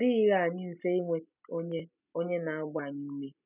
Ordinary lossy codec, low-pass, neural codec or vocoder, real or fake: none; 3.6 kHz; none; real